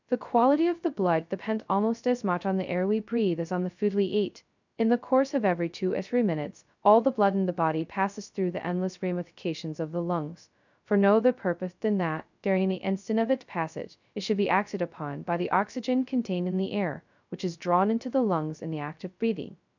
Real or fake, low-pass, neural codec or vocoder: fake; 7.2 kHz; codec, 16 kHz, 0.2 kbps, FocalCodec